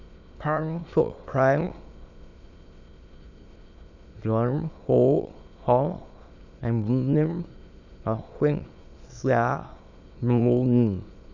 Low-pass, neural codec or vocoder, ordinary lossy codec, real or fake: 7.2 kHz; autoencoder, 22.05 kHz, a latent of 192 numbers a frame, VITS, trained on many speakers; none; fake